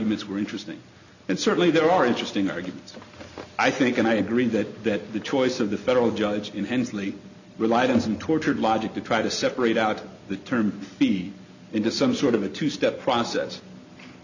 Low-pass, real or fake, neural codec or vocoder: 7.2 kHz; real; none